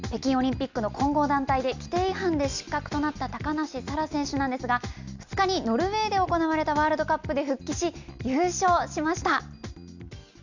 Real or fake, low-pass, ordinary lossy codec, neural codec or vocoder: real; 7.2 kHz; none; none